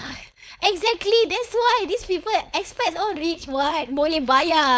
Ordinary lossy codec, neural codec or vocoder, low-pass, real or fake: none; codec, 16 kHz, 4.8 kbps, FACodec; none; fake